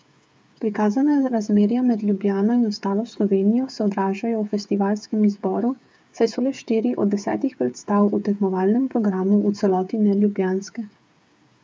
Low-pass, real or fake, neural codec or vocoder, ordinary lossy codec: none; fake; codec, 16 kHz, 8 kbps, FreqCodec, smaller model; none